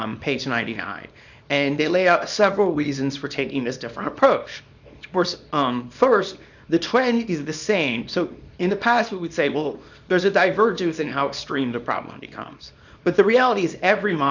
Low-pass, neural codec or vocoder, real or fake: 7.2 kHz; codec, 24 kHz, 0.9 kbps, WavTokenizer, small release; fake